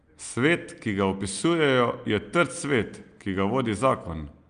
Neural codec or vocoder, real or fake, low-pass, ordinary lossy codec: none; real; 9.9 kHz; Opus, 32 kbps